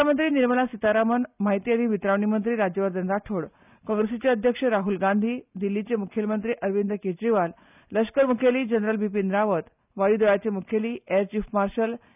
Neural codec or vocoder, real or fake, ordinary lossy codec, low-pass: none; real; none; 3.6 kHz